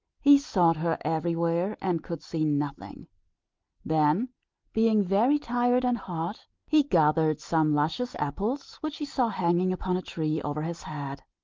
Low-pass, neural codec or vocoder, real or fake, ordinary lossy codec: 7.2 kHz; none; real; Opus, 24 kbps